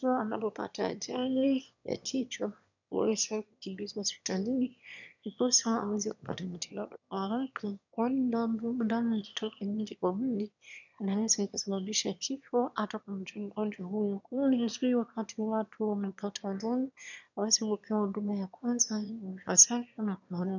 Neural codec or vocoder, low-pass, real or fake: autoencoder, 22.05 kHz, a latent of 192 numbers a frame, VITS, trained on one speaker; 7.2 kHz; fake